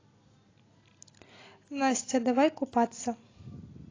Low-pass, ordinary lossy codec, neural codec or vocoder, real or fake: 7.2 kHz; AAC, 32 kbps; none; real